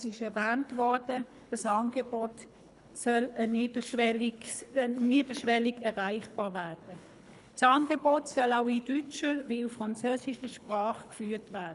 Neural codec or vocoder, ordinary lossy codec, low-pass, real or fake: codec, 24 kHz, 3 kbps, HILCodec; none; 10.8 kHz; fake